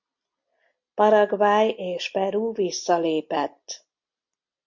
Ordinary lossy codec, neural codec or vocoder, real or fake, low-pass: MP3, 48 kbps; none; real; 7.2 kHz